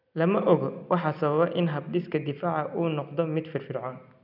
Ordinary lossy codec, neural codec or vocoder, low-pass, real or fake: none; none; 5.4 kHz; real